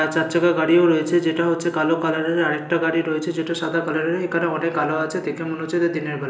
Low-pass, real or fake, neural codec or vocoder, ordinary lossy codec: none; real; none; none